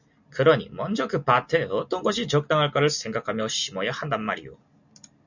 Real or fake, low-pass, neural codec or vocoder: real; 7.2 kHz; none